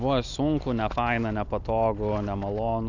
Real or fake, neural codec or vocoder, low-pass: real; none; 7.2 kHz